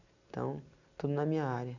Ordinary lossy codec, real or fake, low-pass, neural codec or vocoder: none; real; 7.2 kHz; none